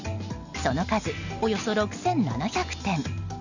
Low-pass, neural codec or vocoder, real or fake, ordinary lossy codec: 7.2 kHz; none; real; none